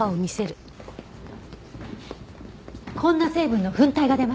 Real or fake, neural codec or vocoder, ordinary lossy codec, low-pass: real; none; none; none